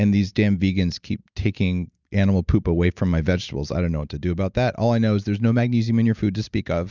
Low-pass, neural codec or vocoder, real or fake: 7.2 kHz; none; real